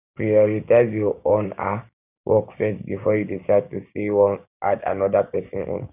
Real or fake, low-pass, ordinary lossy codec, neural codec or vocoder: fake; 3.6 kHz; none; codec, 16 kHz, 6 kbps, DAC